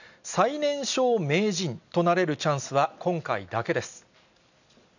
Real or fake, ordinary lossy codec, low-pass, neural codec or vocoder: real; none; 7.2 kHz; none